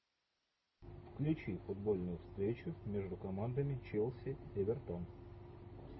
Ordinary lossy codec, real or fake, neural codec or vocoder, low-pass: MP3, 24 kbps; real; none; 7.2 kHz